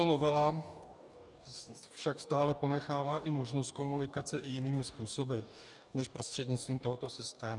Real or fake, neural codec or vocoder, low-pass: fake; codec, 44.1 kHz, 2.6 kbps, DAC; 10.8 kHz